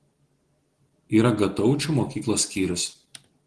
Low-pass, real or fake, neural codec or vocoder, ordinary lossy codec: 10.8 kHz; real; none; Opus, 16 kbps